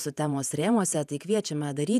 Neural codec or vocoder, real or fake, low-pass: vocoder, 48 kHz, 128 mel bands, Vocos; fake; 14.4 kHz